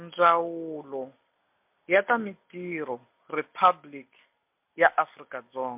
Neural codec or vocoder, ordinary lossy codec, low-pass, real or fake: none; MP3, 32 kbps; 3.6 kHz; real